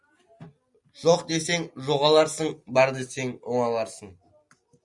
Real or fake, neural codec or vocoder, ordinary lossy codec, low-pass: real; none; Opus, 64 kbps; 10.8 kHz